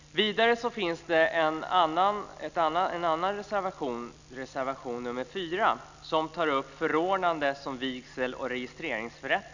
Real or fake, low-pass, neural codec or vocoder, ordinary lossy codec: real; 7.2 kHz; none; none